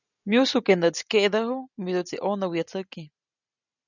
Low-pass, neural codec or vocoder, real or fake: 7.2 kHz; none; real